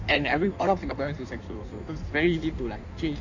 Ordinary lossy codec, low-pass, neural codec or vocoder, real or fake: none; 7.2 kHz; codec, 16 kHz in and 24 kHz out, 1.1 kbps, FireRedTTS-2 codec; fake